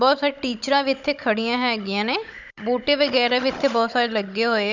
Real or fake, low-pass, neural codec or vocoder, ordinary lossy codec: fake; 7.2 kHz; codec, 16 kHz, 16 kbps, FunCodec, trained on Chinese and English, 50 frames a second; none